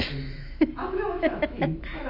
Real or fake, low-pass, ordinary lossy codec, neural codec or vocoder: fake; 5.4 kHz; MP3, 32 kbps; autoencoder, 48 kHz, 128 numbers a frame, DAC-VAE, trained on Japanese speech